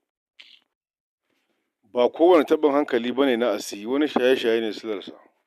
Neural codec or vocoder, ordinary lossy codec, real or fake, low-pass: none; none; real; 14.4 kHz